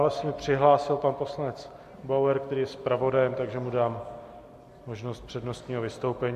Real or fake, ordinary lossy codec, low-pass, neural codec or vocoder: real; MP3, 64 kbps; 14.4 kHz; none